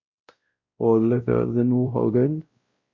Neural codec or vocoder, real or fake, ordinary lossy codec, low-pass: codec, 16 kHz, 0.5 kbps, X-Codec, WavLM features, trained on Multilingual LibriSpeech; fake; Opus, 64 kbps; 7.2 kHz